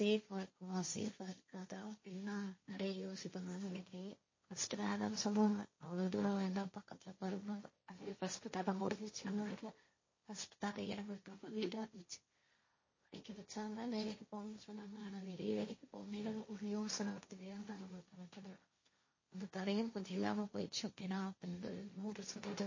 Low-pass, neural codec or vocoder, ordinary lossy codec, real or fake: 7.2 kHz; codec, 16 kHz, 1.1 kbps, Voila-Tokenizer; MP3, 32 kbps; fake